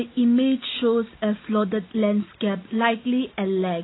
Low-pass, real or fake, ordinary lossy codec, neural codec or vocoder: 7.2 kHz; real; AAC, 16 kbps; none